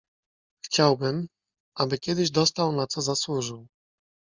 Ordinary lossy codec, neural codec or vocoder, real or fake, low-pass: Opus, 64 kbps; none; real; 7.2 kHz